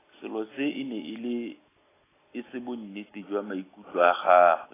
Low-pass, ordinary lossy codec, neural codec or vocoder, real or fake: 3.6 kHz; AAC, 16 kbps; none; real